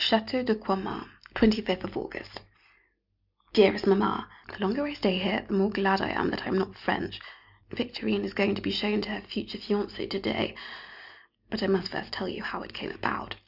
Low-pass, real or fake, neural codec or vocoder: 5.4 kHz; real; none